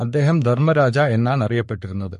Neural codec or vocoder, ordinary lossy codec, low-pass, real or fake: codec, 44.1 kHz, 3.4 kbps, Pupu-Codec; MP3, 48 kbps; 14.4 kHz; fake